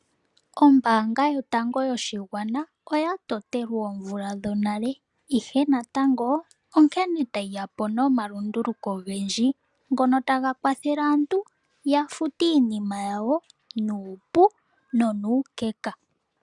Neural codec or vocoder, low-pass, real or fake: none; 10.8 kHz; real